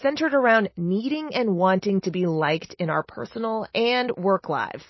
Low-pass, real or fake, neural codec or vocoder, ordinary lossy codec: 7.2 kHz; real; none; MP3, 24 kbps